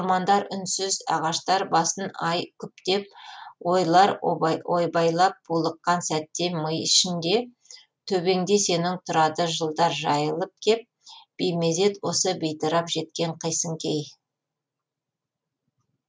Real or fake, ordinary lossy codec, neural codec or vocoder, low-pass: real; none; none; none